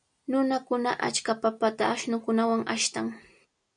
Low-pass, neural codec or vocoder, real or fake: 9.9 kHz; none; real